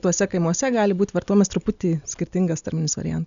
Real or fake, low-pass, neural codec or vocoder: real; 7.2 kHz; none